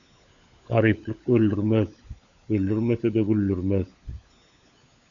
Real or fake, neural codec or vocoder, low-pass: fake; codec, 16 kHz, 16 kbps, FunCodec, trained on LibriTTS, 50 frames a second; 7.2 kHz